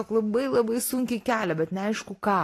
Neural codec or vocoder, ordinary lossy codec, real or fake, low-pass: none; AAC, 48 kbps; real; 14.4 kHz